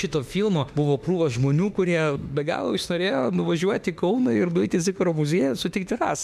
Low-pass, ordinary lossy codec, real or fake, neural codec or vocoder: 14.4 kHz; MP3, 96 kbps; fake; autoencoder, 48 kHz, 32 numbers a frame, DAC-VAE, trained on Japanese speech